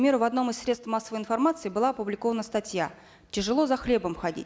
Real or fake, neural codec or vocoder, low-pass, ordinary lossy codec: real; none; none; none